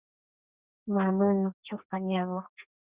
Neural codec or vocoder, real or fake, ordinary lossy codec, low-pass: codec, 32 kHz, 1.9 kbps, SNAC; fake; Opus, 64 kbps; 3.6 kHz